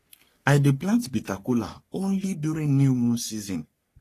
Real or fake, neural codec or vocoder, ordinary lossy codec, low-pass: fake; codec, 44.1 kHz, 3.4 kbps, Pupu-Codec; AAC, 48 kbps; 14.4 kHz